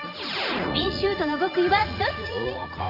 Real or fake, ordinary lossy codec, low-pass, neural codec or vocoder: fake; none; 5.4 kHz; vocoder, 44.1 kHz, 80 mel bands, Vocos